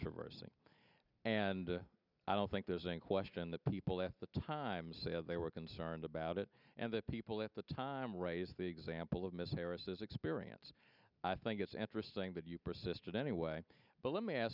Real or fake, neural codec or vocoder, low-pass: real; none; 5.4 kHz